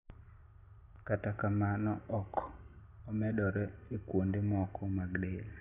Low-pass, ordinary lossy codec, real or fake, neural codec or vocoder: 3.6 kHz; none; real; none